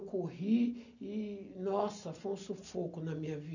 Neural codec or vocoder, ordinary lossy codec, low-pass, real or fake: none; none; 7.2 kHz; real